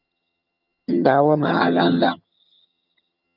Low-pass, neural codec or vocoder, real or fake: 5.4 kHz; vocoder, 22.05 kHz, 80 mel bands, HiFi-GAN; fake